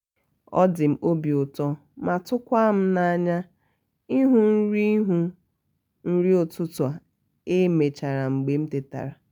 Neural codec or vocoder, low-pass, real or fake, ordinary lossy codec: none; 19.8 kHz; real; none